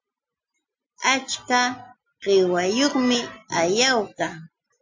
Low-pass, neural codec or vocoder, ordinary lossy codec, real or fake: 7.2 kHz; none; MP3, 64 kbps; real